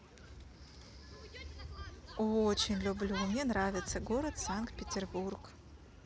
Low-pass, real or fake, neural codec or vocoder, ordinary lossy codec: none; real; none; none